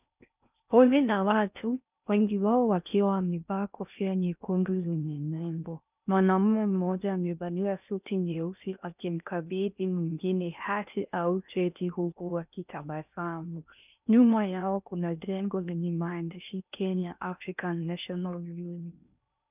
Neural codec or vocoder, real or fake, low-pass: codec, 16 kHz in and 24 kHz out, 0.6 kbps, FocalCodec, streaming, 4096 codes; fake; 3.6 kHz